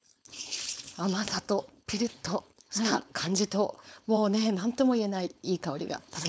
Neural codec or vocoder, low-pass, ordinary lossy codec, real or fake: codec, 16 kHz, 4.8 kbps, FACodec; none; none; fake